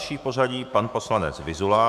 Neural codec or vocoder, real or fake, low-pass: autoencoder, 48 kHz, 128 numbers a frame, DAC-VAE, trained on Japanese speech; fake; 14.4 kHz